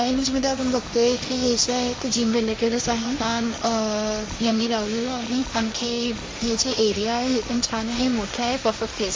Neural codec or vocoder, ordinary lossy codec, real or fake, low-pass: codec, 16 kHz, 1.1 kbps, Voila-Tokenizer; none; fake; none